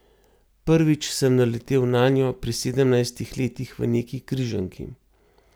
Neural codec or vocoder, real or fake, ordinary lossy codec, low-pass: none; real; none; none